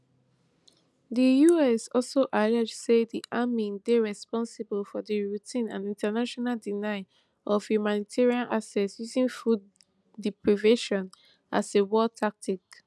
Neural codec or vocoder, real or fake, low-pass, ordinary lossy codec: none; real; none; none